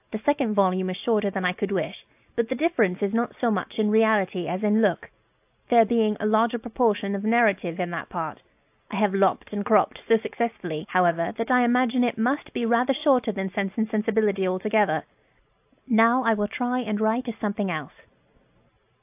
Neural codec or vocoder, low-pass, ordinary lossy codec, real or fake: none; 3.6 kHz; AAC, 32 kbps; real